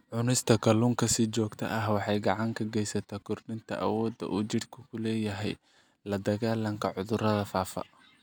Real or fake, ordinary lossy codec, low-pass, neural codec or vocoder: real; none; none; none